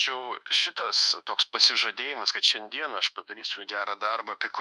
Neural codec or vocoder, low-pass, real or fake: codec, 24 kHz, 1.2 kbps, DualCodec; 10.8 kHz; fake